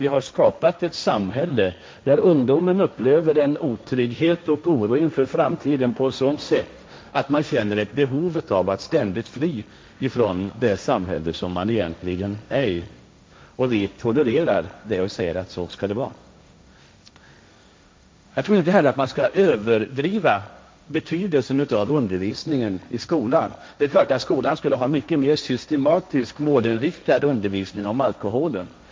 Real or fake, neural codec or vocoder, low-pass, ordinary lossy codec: fake; codec, 16 kHz, 1.1 kbps, Voila-Tokenizer; none; none